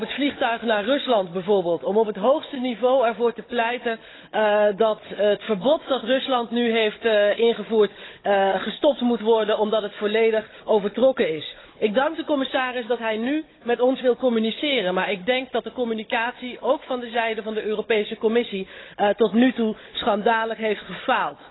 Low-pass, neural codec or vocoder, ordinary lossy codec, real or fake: 7.2 kHz; codec, 16 kHz, 16 kbps, FunCodec, trained on Chinese and English, 50 frames a second; AAC, 16 kbps; fake